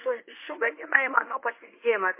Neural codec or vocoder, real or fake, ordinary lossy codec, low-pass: codec, 24 kHz, 0.9 kbps, WavTokenizer, medium speech release version 1; fake; MP3, 24 kbps; 3.6 kHz